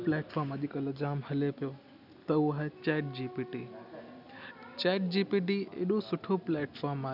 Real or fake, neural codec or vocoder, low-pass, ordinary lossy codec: real; none; 5.4 kHz; none